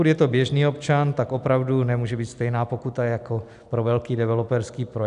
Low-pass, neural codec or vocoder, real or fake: 10.8 kHz; none; real